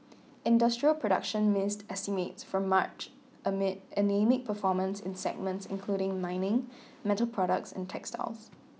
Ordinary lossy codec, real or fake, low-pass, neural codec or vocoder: none; real; none; none